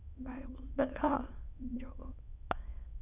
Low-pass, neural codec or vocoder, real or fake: 3.6 kHz; autoencoder, 22.05 kHz, a latent of 192 numbers a frame, VITS, trained on many speakers; fake